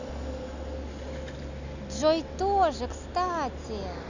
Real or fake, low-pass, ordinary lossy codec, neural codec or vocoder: real; 7.2 kHz; none; none